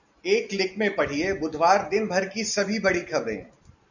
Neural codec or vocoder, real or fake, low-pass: none; real; 7.2 kHz